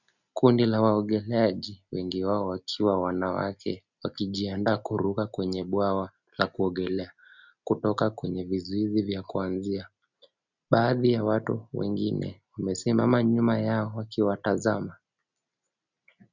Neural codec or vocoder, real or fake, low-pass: none; real; 7.2 kHz